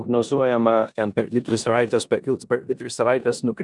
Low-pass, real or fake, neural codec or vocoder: 10.8 kHz; fake; codec, 16 kHz in and 24 kHz out, 0.9 kbps, LongCat-Audio-Codec, four codebook decoder